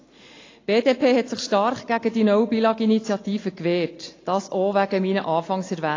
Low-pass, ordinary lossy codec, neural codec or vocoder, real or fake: 7.2 kHz; AAC, 32 kbps; none; real